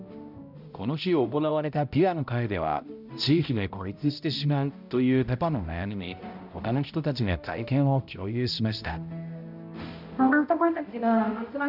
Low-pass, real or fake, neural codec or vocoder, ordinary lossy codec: 5.4 kHz; fake; codec, 16 kHz, 0.5 kbps, X-Codec, HuBERT features, trained on balanced general audio; none